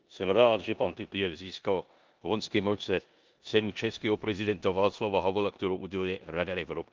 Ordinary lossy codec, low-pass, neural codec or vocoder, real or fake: Opus, 24 kbps; 7.2 kHz; codec, 16 kHz in and 24 kHz out, 0.9 kbps, LongCat-Audio-Codec, four codebook decoder; fake